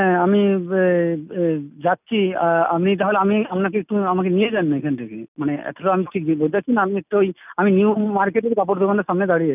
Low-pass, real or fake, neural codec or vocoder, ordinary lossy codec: 3.6 kHz; real; none; none